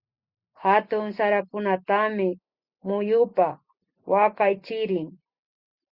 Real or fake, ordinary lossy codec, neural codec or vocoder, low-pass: real; Opus, 64 kbps; none; 5.4 kHz